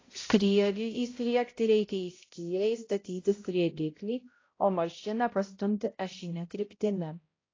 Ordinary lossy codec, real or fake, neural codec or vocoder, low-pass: AAC, 32 kbps; fake; codec, 16 kHz, 0.5 kbps, X-Codec, HuBERT features, trained on balanced general audio; 7.2 kHz